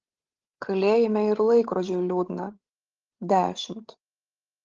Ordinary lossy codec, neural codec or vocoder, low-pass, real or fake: Opus, 16 kbps; codec, 16 kHz, 16 kbps, FreqCodec, larger model; 7.2 kHz; fake